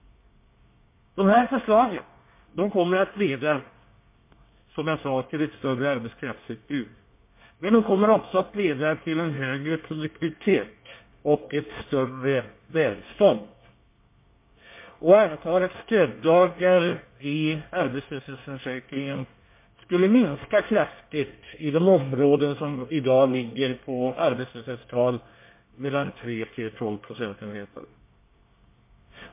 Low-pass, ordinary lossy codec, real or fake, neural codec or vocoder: 3.6 kHz; MP3, 24 kbps; fake; codec, 24 kHz, 1 kbps, SNAC